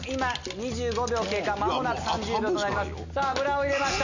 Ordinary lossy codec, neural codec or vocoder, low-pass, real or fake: none; none; 7.2 kHz; real